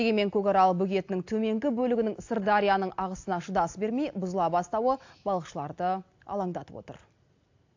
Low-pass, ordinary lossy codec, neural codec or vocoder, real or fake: 7.2 kHz; AAC, 48 kbps; none; real